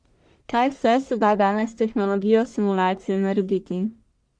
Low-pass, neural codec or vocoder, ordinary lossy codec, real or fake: 9.9 kHz; codec, 44.1 kHz, 1.7 kbps, Pupu-Codec; Opus, 64 kbps; fake